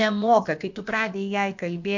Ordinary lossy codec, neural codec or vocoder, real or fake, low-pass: MP3, 48 kbps; codec, 16 kHz, about 1 kbps, DyCAST, with the encoder's durations; fake; 7.2 kHz